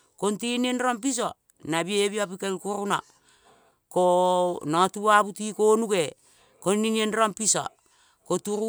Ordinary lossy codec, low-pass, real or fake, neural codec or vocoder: none; none; real; none